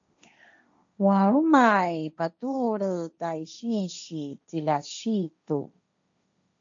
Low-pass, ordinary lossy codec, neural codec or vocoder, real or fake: 7.2 kHz; AAC, 64 kbps; codec, 16 kHz, 1.1 kbps, Voila-Tokenizer; fake